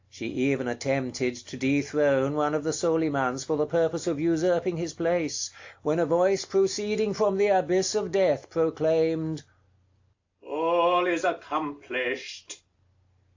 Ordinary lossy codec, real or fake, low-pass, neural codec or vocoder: AAC, 48 kbps; real; 7.2 kHz; none